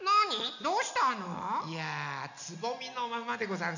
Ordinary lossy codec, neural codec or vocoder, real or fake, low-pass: none; none; real; 7.2 kHz